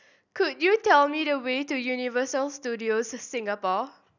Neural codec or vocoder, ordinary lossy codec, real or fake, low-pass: none; none; real; 7.2 kHz